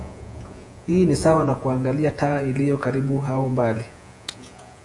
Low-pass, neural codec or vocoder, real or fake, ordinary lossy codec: 10.8 kHz; vocoder, 48 kHz, 128 mel bands, Vocos; fake; AAC, 64 kbps